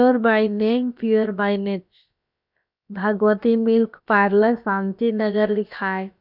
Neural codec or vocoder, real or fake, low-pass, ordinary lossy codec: codec, 16 kHz, about 1 kbps, DyCAST, with the encoder's durations; fake; 5.4 kHz; none